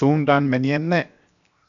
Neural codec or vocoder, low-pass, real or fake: codec, 16 kHz, 0.7 kbps, FocalCodec; 7.2 kHz; fake